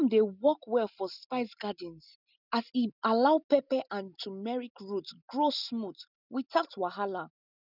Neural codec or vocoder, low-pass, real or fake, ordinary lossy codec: none; 5.4 kHz; real; none